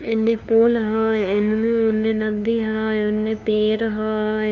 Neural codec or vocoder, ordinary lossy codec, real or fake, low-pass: codec, 16 kHz, 2 kbps, FunCodec, trained on LibriTTS, 25 frames a second; none; fake; 7.2 kHz